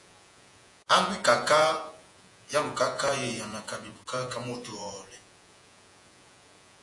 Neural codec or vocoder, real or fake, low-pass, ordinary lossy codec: vocoder, 48 kHz, 128 mel bands, Vocos; fake; 10.8 kHz; AAC, 64 kbps